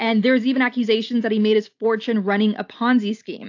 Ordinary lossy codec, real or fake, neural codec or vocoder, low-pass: AAC, 48 kbps; real; none; 7.2 kHz